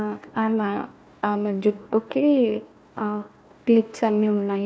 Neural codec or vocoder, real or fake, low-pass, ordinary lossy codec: codec, 16 kHz, 1 kbps, FunCodec, trained on Chinese and English, 50 frames a second; fake; none; none